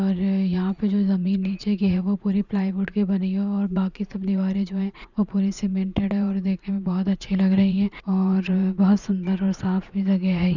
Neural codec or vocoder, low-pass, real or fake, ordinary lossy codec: vocoder, 44.1 kHz, 80 mel bands, Vocos; 7.2 kHz; fake; none